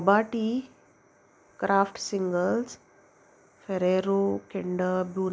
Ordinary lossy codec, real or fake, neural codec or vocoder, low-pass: none; real; none; none